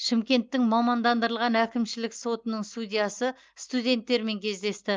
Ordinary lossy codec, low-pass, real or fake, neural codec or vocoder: Opus, 32 kbps; 7.2 kHz; real; none